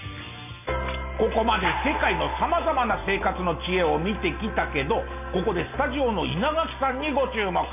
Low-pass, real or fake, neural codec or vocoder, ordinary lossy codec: 3.6 kHz; real; none; none